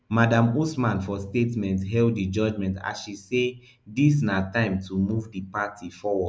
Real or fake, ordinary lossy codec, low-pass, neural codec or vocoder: real; none; none; none